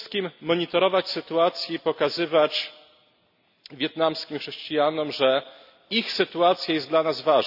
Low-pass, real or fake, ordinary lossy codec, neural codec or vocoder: 5.4 kHz; real; none; none